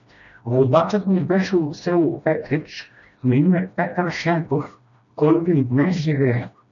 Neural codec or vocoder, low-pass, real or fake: codec, 16 kHz, 1 kbps, FreqCodec, smaller model; 7.2 kHz; fake